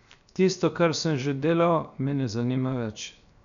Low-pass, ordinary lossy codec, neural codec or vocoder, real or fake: 7.2 kHz; none; codec, 16 kHz, 0.7 kbps, FocalCodec; fake